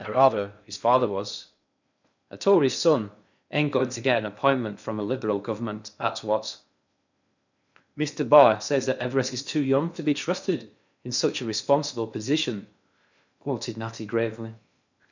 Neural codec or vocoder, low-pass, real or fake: codec, 16 kHz in and 24 kHz out, 0.6 kbps, FocalCodec, streaming, 2048 codes; 7.2 kHz; fake